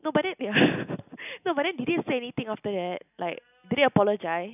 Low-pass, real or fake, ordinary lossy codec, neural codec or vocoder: 3.6 kHz; real; none; none